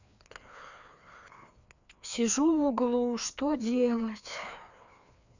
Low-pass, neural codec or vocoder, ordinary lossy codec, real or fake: 7.2 kHz; codec, 16 kHz, 2 kbps, FreqCodec, larger model; none; fake